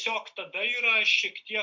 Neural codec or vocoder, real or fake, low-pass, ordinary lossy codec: none; real; 7.2 kHz; MP3, 64 kbps